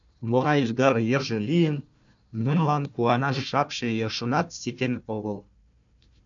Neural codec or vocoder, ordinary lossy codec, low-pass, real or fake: codec, 16 kHz, 1 kbps, FunCodec, trained on Chinese and English, 50 frames a second; MP3, 64 kbps; 7.2 kHz; fake